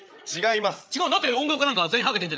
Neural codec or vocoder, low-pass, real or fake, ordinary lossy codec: codec, 16 kHz, 8 kbps, FreqCodec, larger model; none; fake; none